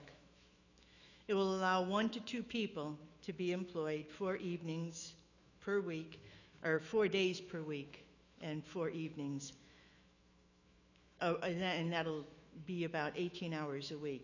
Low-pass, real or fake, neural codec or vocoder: 7.2 kHz; fake; autoencoder, 48 kHz, 128 numbers a frame, DAC-VAE, trained on Japanese speech